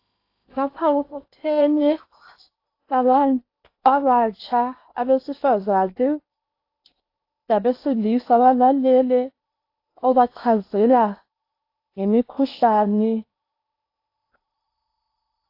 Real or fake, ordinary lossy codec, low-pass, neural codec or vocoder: fake; AAC, 32 kbps; 5.4 kHz; codec, 16 kHz in and 24 kHz out, 0.8 kbps, FocalCodec, streaming, 65536 codes